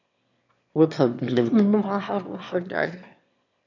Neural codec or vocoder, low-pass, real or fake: autoencoder, 22.05 kHz, a latent of 192 numbers a frame, VITS, trained on one speaker; 7.2 kHz; fake